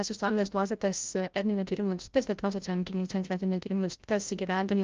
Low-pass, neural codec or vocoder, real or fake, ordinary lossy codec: 7.2 kHz; codec, 16 kHz, 0.5 kbps, FreqCodec, larger model; fake; Opus, 24 kbps